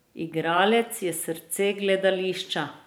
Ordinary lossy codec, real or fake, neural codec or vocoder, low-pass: none; fake; vocoder, 44.1 kHz, 128 mel bands every 512 samples, BigVGAN v2; none